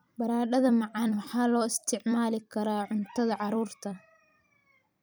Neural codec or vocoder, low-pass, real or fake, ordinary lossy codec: vocoder, 44.1 kHz, 128 mel bands every 256 samples, BigVGAN v2; none; fake; none